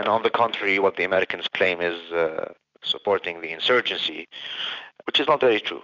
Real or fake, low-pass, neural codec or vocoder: real; 7.2 kHz; none